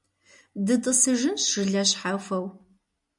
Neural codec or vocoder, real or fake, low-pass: none; real; 10.8 kHz